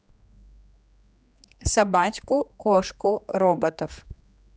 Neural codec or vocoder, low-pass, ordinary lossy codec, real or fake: codec, 16 kHz, 2 kbps, X-Codec, HuBERT features, trained on general audio; none; none; fake